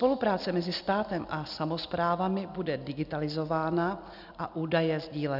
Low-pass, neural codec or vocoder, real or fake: 5.4 kHz; none; real